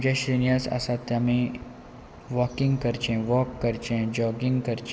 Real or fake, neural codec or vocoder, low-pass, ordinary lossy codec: real; none; none; none